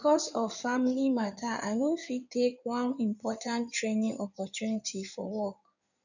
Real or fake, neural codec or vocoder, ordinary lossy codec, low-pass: fake; codec, 16 kHz in and 24 kHz out, 2.2 kbps, FireRedTTS-2 codec; none; 7.2 kHz